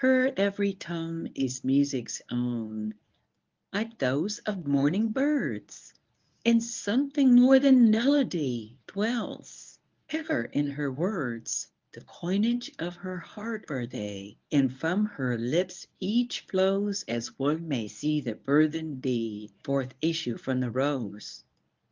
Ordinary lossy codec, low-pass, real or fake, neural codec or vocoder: Opus, 32 kbps; 7.2 kHz; fake; codec, 24 kHz, 0.9 kbps, WavTokenizer, medium speech release version 1